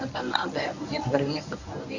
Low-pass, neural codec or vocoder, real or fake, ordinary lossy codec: 7.2 kHz; codec, 24 kHz, 0.9 kbps, WavTokenizer, medium speech release version 1; fake; none